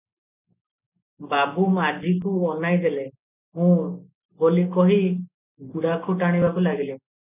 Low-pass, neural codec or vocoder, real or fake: 3.6 kHz; none; real